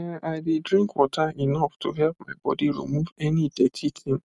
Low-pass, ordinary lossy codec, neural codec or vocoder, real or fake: 10.8 kHz; none; none; real